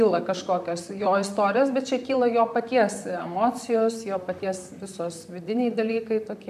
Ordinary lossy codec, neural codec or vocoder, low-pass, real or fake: MP3, 96 kbps; vocoder, 44.1 kHz, 128 mel bands, Pupu-Vocoder; 14.4 kHz; fake